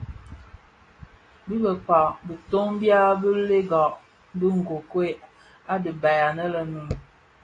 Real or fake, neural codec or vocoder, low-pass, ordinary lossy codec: real; none; 9.9 kHz; AAC, 32 kbps